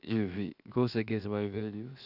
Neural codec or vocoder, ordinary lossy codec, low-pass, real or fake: codec, 16 kHz, 0.7 kbps, FocalCodec; none; 5.4 kHz; fake